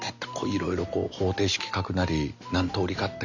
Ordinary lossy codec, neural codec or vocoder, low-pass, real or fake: none; none; 7.2 kHz; real